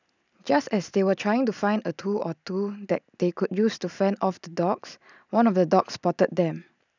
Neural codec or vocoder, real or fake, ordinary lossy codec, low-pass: none; real; none; 7.2 kHz